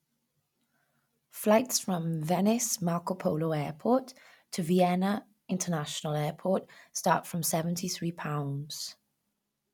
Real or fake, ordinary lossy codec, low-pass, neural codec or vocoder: real; none; 19.8 kHz; none